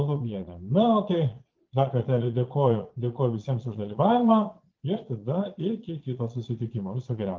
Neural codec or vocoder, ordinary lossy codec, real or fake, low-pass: vocoder, 22.05 kHz, 80 mel bands, Vocos; Opus, 24 kbps; fake; 7.2 kHz